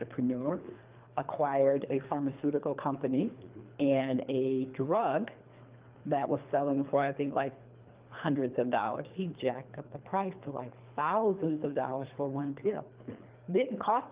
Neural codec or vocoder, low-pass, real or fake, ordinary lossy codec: codec, 24 kHz, 3 kbps, HILCodec; 3.6 kHz; fake; Opus, 24 kbps